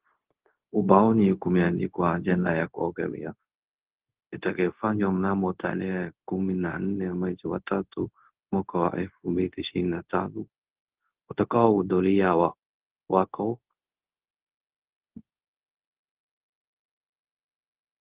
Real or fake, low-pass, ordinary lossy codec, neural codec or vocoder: fake; 3.6 kHz; Opus, 16 kbps; codec, 16 kHz, 0.4 kbps, LongCat-Audio-Codec